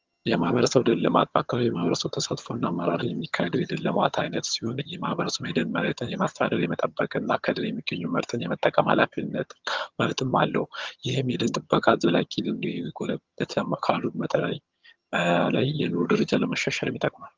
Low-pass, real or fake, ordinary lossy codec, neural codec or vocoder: 7.2 kHz; fake; Opus, 32 kbps; vocoder, 22.05 kHz, 80 mel bands, HiFi-GAN